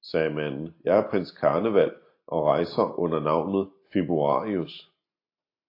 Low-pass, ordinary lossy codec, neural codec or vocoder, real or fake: 5.4 kHz; AAC, 32 kbps; none; real